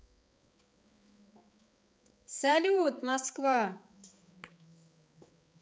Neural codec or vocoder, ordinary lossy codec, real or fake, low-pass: codec, 16 kHz, 4 kbps, X-Codec, HuBERT features, trained on balanced general audio; none; fake; none